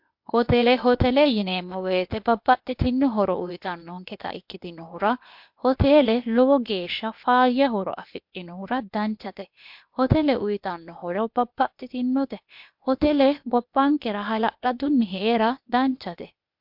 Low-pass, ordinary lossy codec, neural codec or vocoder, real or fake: 5.4 kHz; MP3, 48 kbps; codec, 16 kHz, 0.8 kbps, ZipCodec; fake